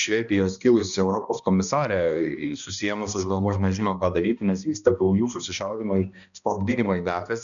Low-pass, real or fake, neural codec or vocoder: 7.2 kHz; fake; codec, 16 kHz, 1 kbps, X-Codec, HuBERT features, trained on balanced general audio